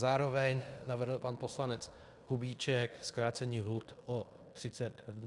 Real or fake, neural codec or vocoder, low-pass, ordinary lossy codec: fake; codec, 16 kHz in and 24 kHz out, 0.9 kbps, LongCat-Audio-Codec, fine tuned four codebook decoder; 10.8 kHz; Opus, 64 kbps